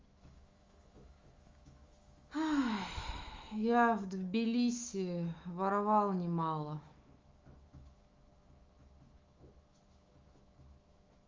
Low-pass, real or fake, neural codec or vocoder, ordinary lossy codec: 7.2 kHz; fake; autoencoder, 48 kHz, 128 numbers a frame, DAC-VAE, trained on Japanese speech; Opus, 32 kbps